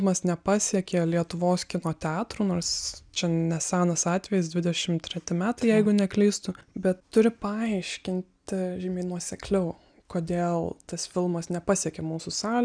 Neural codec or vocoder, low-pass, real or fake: none; 9.9 kHz; real